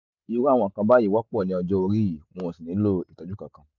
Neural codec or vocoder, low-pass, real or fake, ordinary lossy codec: none; 7.2 kHz; real; none